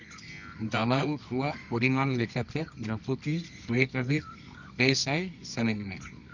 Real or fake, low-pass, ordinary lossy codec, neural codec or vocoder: fake; 7.2 kHz; none; codec, 24 kHz, 0.9 kbps, WavTokenizer, medium music audio release